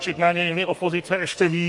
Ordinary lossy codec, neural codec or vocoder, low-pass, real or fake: MP3, 48 kbps; codec, 32 kHz, 1.9 kbps, SNAC; 10.8 kHz; fake